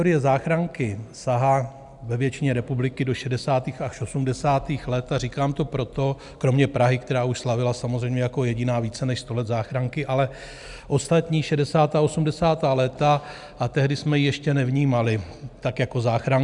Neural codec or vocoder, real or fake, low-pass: none; real; 10.8 kHz